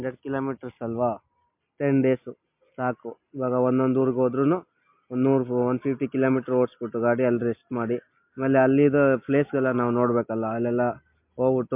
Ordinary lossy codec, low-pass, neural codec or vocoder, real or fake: MP3, 32 kbps; 3.6 kHz; none; real